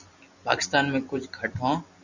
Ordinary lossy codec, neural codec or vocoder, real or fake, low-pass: Opus, 64 kbps; none; real; 7.2 kHz